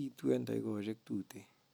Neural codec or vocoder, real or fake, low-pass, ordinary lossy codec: none; real; none; none